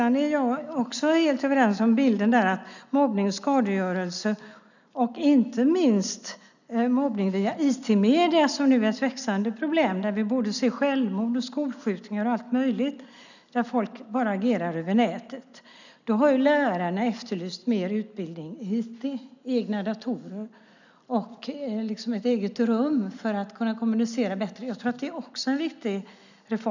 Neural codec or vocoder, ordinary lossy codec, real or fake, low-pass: none; none; real; 7.2 kHz